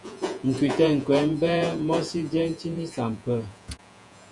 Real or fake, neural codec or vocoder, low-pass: fake; vocoder, 48 kHz, 128 mel bands, Vocos; 10.8 kHz